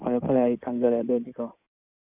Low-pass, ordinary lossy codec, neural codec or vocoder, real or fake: 3.6 kHz; none; codec, 16 kHz in and 24 kHz out, 2.2 kbps, FireRedTTS-2 codec; fake